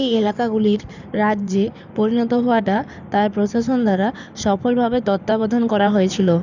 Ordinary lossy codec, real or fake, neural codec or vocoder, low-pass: none; fake; codec, 16 kHz in and 24 kHz out, 2.2 kbps, FireRedTTS-2 codec; 7.2 kHz